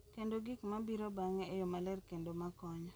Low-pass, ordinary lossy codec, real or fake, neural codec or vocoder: none; none; real; none